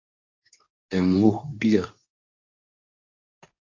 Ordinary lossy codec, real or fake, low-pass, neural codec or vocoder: AAC, 48 kbps; fake; 7.2 kHz; codec, 24 kHz, 0.9 kbps, WavTokenizer, medium speech release version 2